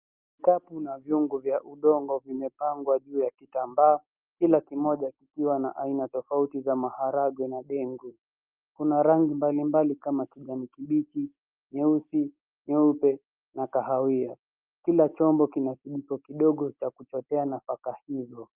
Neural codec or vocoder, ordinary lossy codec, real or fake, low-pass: none; Opus, 32 kbps; real; 3.6 kHz